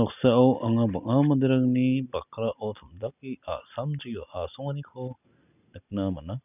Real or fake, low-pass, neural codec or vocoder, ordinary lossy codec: real; 3.6 kHz; none; none